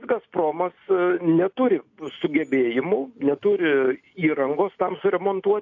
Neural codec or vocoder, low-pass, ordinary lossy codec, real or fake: none; 7.2 kHz; AAC, 48 kbps; real